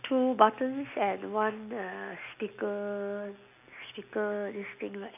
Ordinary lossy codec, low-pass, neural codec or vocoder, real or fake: none; 3.6 kHz; none; real